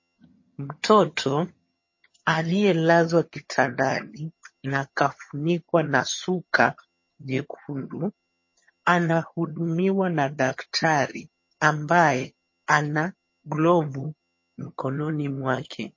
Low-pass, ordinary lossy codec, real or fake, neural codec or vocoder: 7.2 kHz; MP3, 32 kbps; fake; vocoder, 22.05 kHz, 80 mel bands, HiFi-GAN